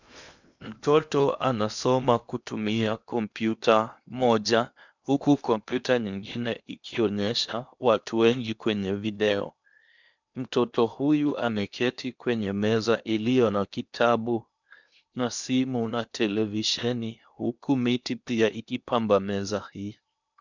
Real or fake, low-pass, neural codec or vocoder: fake; 7.2 kHz; codec, 16 kHz in and 24 kHz out, 0.8 kbps, FocalCodec, streaming, 65536 codes